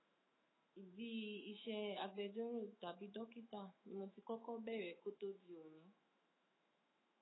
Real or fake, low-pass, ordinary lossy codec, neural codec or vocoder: fake; 7.2 kHz; AAC, 16 kbps; autoencoder, 48 kHz, 128 numbers a frame, DAC-VAE, trained on Japanese speech